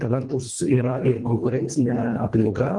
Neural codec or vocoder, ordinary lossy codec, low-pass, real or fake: codec, 24 kHz, 1.5 kbps, HILCodec; Opus, 32 kbps; 10.8 kHz; fake